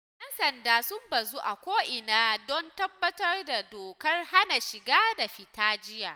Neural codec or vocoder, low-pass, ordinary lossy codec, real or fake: none; none; none; real